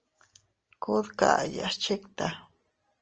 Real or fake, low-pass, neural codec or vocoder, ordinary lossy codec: real; 7.2 kHz; none; Opus, 24 kbps